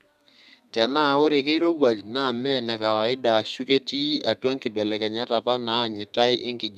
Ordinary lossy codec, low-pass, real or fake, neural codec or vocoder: none; 14.4 kHz; fake; codec, 32 kHz, 1.9 kbps, SNAC